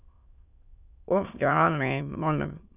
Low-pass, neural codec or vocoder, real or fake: 3.6 kHz; autoencoder, 22.05 kHz, a latent of 192 numbers a frame, VITS, trained on many speakers; fake